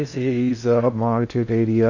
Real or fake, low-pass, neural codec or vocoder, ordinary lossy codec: fake; 7.2 kHz; codec, 16 kHz in and 24 kHz out, 0.6 kbps, FocalCodec, streaming, 2048 codes; none